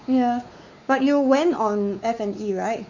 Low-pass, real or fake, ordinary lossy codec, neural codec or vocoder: 7.2 kHz; fake; none; codec, 16 kHz, 4 kbps, X-Codec, WavLM features, trained on Multilingual LibriSpeech